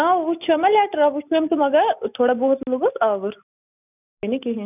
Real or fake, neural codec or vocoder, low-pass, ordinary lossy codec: real; none; 3.6 kHz; none